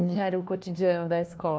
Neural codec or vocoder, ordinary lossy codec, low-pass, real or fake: codec, 16 kHz, 1 kbps, FunCodec, trained on LibriTTS, 50 frames a second; none; none; fake